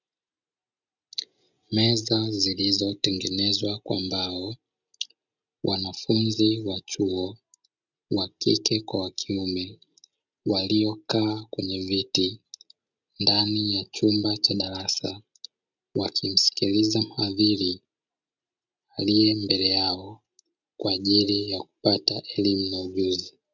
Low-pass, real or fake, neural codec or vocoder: 7.2 kHz; real; none